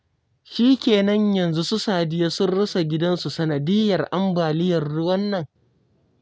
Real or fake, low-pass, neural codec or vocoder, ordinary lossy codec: real; none; none; none